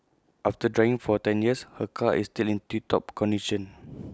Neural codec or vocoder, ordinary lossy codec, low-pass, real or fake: none; none; none; real